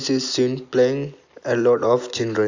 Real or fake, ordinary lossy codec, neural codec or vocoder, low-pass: real; AAC, 48 kbps; none; 7.2 kHz